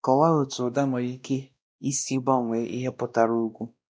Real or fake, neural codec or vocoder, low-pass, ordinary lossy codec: fake; codec, 16 kHz, 1 kbps, X-Codec, WavLM features, trained on Multilingual LibriSpeech; none; none